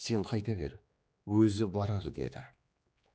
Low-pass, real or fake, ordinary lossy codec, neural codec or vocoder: none; fake; none; codec, 16 kHz, 2 kbps, X-Codec, HuBERT features, trained on general audio